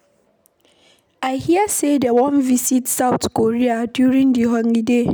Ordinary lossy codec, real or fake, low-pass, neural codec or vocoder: none; real; none; none